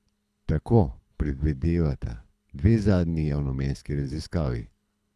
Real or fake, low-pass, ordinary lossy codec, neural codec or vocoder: fake; none; none; codec, 24 kHz, 6 kbps, HILCodec